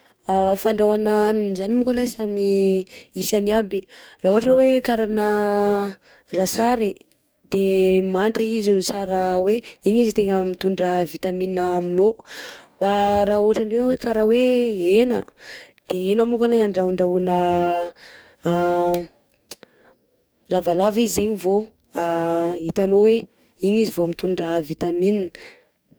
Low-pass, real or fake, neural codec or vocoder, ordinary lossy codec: none; fake; codec, 44.1 kHz, 2.6 kbps, DAC; none